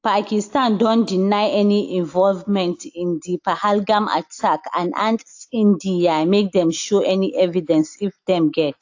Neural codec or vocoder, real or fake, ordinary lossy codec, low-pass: none; real; AAC, 48 kbps; 7.2 kHz